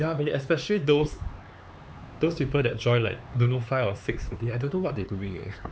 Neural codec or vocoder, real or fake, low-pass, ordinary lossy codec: codec, 16 kHz, 4 kbps, X-Codec, HuBERT features, trained on LibriSpeech; fake; none; none